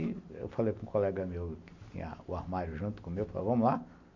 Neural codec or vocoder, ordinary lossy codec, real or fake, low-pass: none; MP3, 64 kbps; real; 7.2 kHz